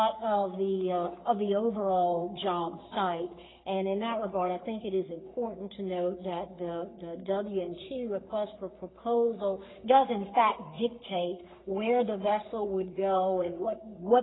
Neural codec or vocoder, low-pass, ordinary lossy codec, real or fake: codec, 44.1 kHz, 3.4 kbps, Pupu-Codec; 7.2 kHz; AAC, 16 kbps; fake